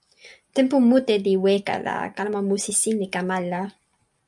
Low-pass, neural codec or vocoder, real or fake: 10.8 kHz; none; real